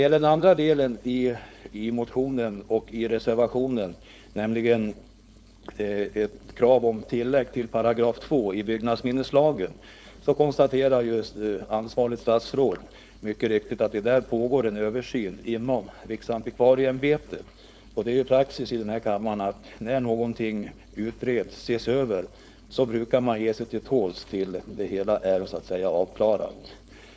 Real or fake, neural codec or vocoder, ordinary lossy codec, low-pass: fake; codec, 16 kHz, 4.8 kbps, FACodec; none; none